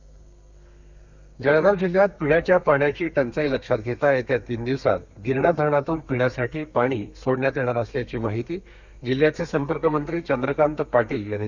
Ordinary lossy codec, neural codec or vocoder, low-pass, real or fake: Opus, 64 kbps; codec, 32 kHz, 1.9 kbps, SNAC; 7.2 kHz; fake